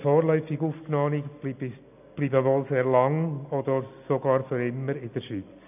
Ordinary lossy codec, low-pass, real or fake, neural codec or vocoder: none; 3.6 kHz; real; none